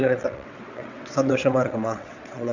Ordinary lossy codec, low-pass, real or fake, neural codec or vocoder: none; 7.2 kHz; fake; vocoder, 22.05 kHz, 80 mel bands, WaveNeXt